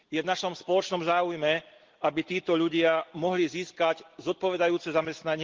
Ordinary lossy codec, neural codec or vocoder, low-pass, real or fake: Opus, 16 kbps; none; 7.2 kHz; real